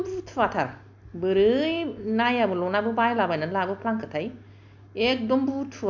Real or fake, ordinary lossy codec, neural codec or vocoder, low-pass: real; none; none; 7.2 kHz